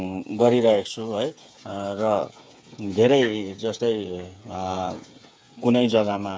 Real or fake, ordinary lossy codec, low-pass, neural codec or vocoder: fake; none; none; codec, 16 kHz, 8 kbps, FreqCodec, smaller model